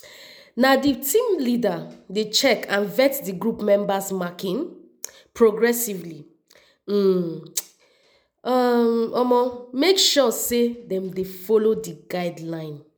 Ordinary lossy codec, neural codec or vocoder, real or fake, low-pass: none; none; real; none